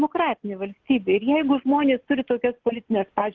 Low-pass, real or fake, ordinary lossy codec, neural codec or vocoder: 7.2 kHz; real; Opus, 16 kbps; none